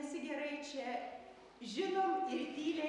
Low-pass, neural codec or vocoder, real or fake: 10.8 kHz; none; real